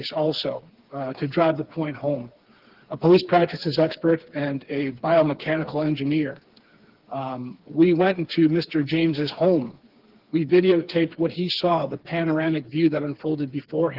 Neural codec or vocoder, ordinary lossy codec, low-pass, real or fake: codec, 16 kHz, 4 kbps, FreqCodec, smaller model; Opus, 16 kbps; 5.4 kHz; fake